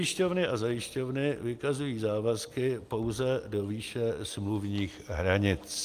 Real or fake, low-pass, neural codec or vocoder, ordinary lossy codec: real; 14.4 kHz; none; Opus, 32 kbps